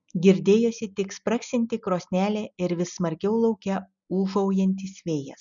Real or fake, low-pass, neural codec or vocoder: real; 7.2 kHz; none